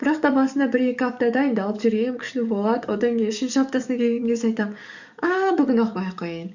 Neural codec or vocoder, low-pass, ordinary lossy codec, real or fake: codec, 44.1 kHz, 7.8 kbps, DAC; 7.2 kHz; none; fake